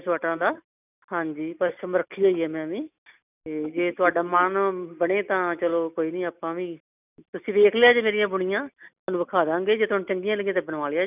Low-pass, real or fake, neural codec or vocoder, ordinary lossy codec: 3.6 kHz; real; none; none